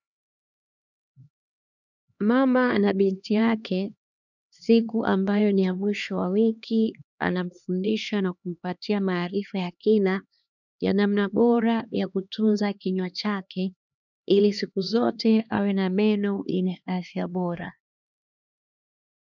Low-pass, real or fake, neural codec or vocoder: 7.2 kHz; fake; codec, 16 kHz, 2 kbps, X-Codec, HuBERT features, trained on LibriSpeech